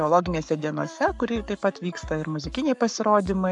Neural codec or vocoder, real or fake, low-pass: codec, 44.1 kHz, 7.8 kbps, Pupu-Codec; fake; 10.8 kHz